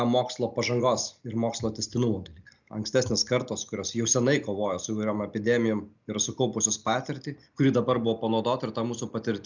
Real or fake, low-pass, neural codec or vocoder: real; 7.2 kHz; none